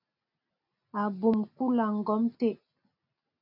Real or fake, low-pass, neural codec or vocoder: real; 5.4 kHz; none